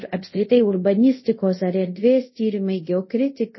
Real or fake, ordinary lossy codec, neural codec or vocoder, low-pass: fake; MP3, 24 kbps; codec, 24 kHz, 0.5 kbps, DualCodec; 7.2 kHz